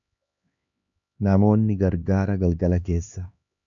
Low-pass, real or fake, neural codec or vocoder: 7.2 kHz; fake; codec, 16 kHz, 2 kbps, X-Codec, HuBERT features, trained on LibriSpeech